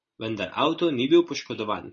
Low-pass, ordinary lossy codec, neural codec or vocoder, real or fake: 7.2 kHz; MP3, 32 kbps; none; real